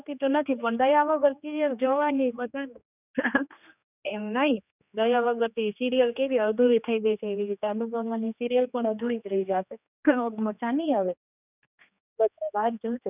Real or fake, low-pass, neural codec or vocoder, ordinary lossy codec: fake; 3.6 kHz; codec, 16 kHz, 2 kbps, X-Codec, HuBERT features, trained on general audio; none